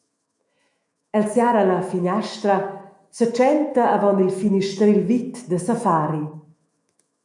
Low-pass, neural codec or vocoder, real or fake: 10.8 kHz; autoencoder, 48 kHz, 128 numbers a frame, DAC-VAE, trained on Japanese speech; fake